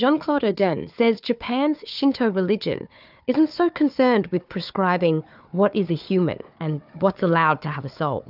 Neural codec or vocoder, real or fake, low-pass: codec, 16 kHz, 4 kbps, FunCodec, trained on Chinese and English, 50 frames a second; fake; 5.4 kHz